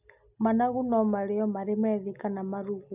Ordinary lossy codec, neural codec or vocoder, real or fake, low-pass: none; none; real; 3.6 kHz